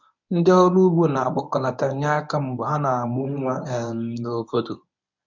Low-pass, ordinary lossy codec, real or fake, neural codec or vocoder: 7.2 kHz; none; fake; codec, 24 kHz, 0.9 kbps, WavTokenizer, medium speech release version 1